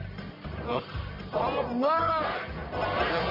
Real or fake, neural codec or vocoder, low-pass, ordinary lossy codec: fake; codec, 44.1 kHz, 1.7 kbps, Pupu-Codec; 5.4 kHz; MP3, 24 kbps